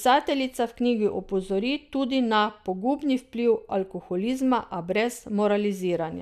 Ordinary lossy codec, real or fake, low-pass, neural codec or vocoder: none; real; 14.4 kHz; none